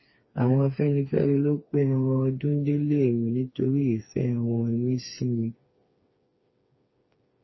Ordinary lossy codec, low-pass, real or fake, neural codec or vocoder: MP3, 24 kbps; 7.2 kHz; fake; codec, 16 kHz, 4 kbps, FreqCodec, smaller model